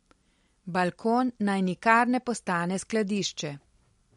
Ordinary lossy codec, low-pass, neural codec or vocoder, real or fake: MP3, 48 kbps; 19.8 kHz; none; real